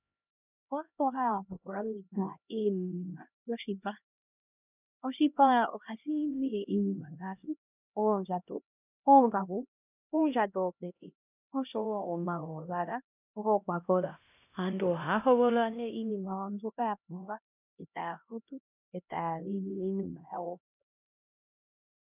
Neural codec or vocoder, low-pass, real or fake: codec, 16 kHz, 1 kbps, X-Codec, HuBERT features, trained on LibriSpeech; 3.6 kHz; fake